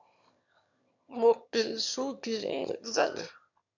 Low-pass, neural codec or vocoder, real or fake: 7.2 kHz; autoencoder, 22.05 kHz, a latent of 192 numbers a frame, VITS, trained on one speaker; fake